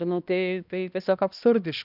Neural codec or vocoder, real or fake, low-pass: codec, 16 kHz, 1 kbps, X-Codec, HuBERT features, trained on balanced general audio; fake; 5.4 kHz